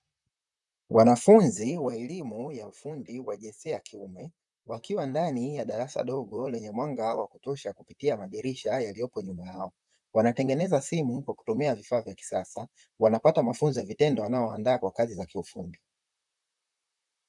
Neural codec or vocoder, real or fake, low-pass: vocoder, 44.1 kHz, 128 mel bands, Pupu-Vocoder; fake; 10.8 kHz